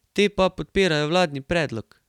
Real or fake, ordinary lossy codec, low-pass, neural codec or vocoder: real; none; 19.8 kHz; none